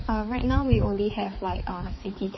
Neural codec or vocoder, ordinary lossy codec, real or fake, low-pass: codec, 16 kHz, 4 kbps, X-Codec, HuBERT features, trained on balanced general audio; MP3, 24 kbps; fake; 7.2 kHz